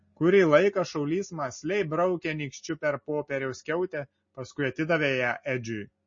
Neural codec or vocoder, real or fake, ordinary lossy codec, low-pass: none; real; MP3, 32 kbps; 7.2 kHz